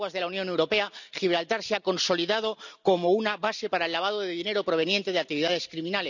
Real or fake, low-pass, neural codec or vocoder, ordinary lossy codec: real; 7.2 kHz; none; none